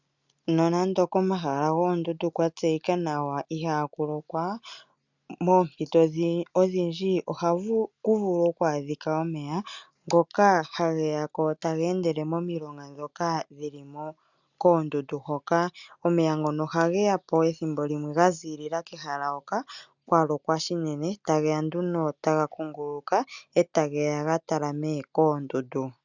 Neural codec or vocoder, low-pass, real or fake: none; 7.2 kHz; real